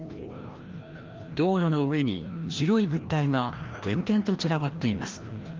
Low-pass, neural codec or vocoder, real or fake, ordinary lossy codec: 7.2 kHz; codec, 16 kHz, 1 kbps, FreqCodec, larger model; fake; Opus, 32 kbps